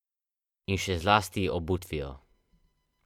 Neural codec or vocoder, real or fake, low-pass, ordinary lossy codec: none; real; 19.8 kHz; MP3, 96 kbps